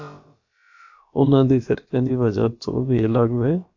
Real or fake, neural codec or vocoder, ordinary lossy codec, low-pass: fake; codec, 16 kHz, about 1 kbps, DyCAST, with the encoder's durations; AAC, 48 kbps; 7.2 kHz